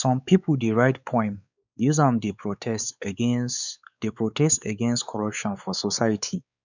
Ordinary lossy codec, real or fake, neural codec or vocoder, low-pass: none; fake; codec, 16 kHz, 4 kbps, X-Codec, WavLM features, trained on Multilingual LibriSpeech; 7.2 kHz